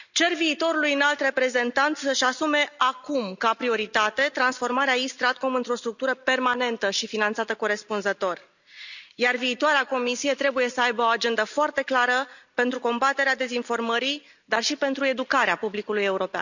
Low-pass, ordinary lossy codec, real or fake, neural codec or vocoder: 7.2 kHz; none; real; none